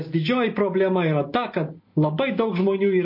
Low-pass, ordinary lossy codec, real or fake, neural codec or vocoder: 5.4 kHz; MP3, 32 kbps; real; none